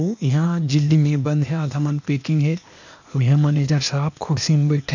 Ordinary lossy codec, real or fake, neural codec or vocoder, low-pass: none; fake; codec, 16 kHz, 0.8 kbps, ZipCodec; 7.2 kHz